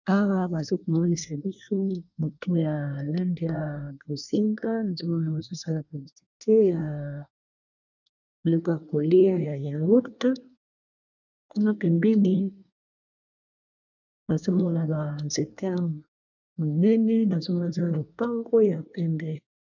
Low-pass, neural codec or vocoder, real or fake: 7.2 kHz; codec, 24 kHz, 1 kbps, SNAC; fake